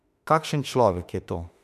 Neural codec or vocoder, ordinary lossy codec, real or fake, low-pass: autoencoder, 48 kHz, 32 numbers a frame, DAC-VAE, trained on Japanese speech; none; fake; 14.4 kHz